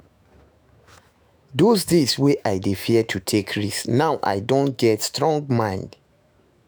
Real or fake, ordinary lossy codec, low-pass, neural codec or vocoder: fake; none; none; autoencoder, 48 kHz, 128 numbers a frame, DAC-VAE, trained on Japanese speech